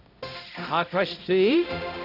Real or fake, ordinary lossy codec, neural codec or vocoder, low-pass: fake; none; codec, 16 kHz, 0.5 kbps, X-Codec, HuBERT features, trained on balanced general audio; 5.4 kHz